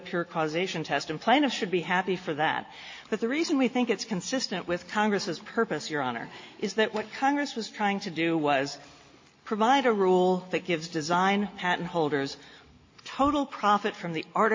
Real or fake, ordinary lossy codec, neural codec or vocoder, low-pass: real; MP3, 32 kbps; none; 7.2 kHz